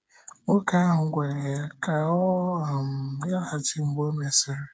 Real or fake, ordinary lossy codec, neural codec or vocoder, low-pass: fake; none; codec, 16 kHz, 8 kbps, FreqCodec, smaller model; none